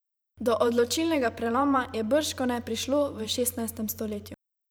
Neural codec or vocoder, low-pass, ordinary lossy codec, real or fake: vocoder, 44.1 kHz, 128 mel bands every 512 samples, BigVGAN v2; none; none; fake